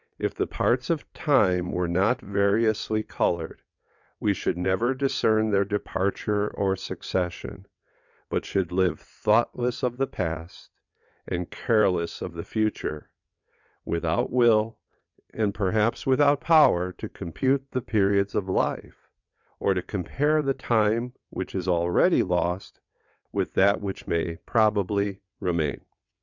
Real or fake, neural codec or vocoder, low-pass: fake; vocoder, 22.05 kHz, 80 mel bands, WaveNeXt; 7.2 kHz